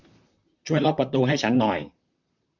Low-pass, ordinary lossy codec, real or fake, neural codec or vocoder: 7.2 kHz; none; fake; vocoder, 44.1 kHz, 128 mel bands, Pupu-Vocoder